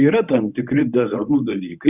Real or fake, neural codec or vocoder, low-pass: fake; codec, 24 kHz, 0.9 kbps, WavTokenizer, medium speech release version 1; 3.6 kHz